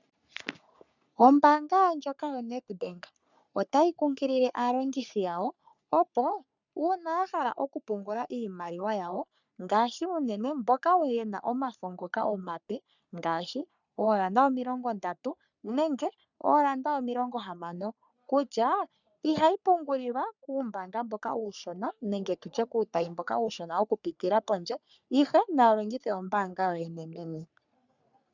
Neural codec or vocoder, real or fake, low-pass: codec, 44.1 kHz, 3.4 kbps, Pupu-Codec; fake; 7.2 kHz